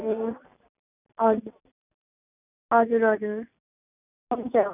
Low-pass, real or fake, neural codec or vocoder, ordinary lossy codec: 3.6 kHz; real; none; none